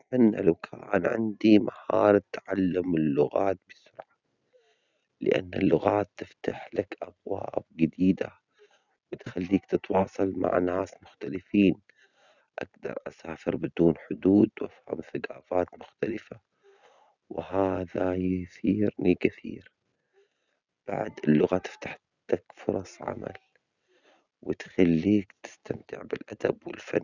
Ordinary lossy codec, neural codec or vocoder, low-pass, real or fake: none; none; 7.2 kHz; real